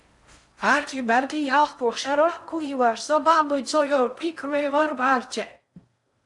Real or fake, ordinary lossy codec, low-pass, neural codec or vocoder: fake; AAC, 64 kbps; 10.8 kHz; codec, 16 kHz in and 24 kHz out, 0.6 kbps, FocalCodec, streaming, 4096 codes